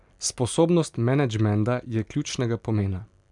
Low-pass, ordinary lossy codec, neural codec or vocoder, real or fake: 10.8 kHz; none; none; real